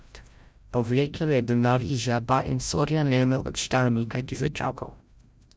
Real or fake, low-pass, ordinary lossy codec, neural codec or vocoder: fake; none; none; codec, 16 kHz, 0.5 kbps, FreqCodec, larger model